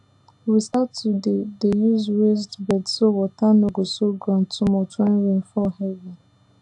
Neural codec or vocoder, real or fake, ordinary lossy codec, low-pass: none; real; AAC, 64 kbps; 10.8 kHz